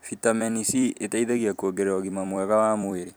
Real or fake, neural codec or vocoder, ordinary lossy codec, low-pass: fake; vocoder, 44.1 kHz, 128 mel bands every 256 samples, BigVGAN v2; none; none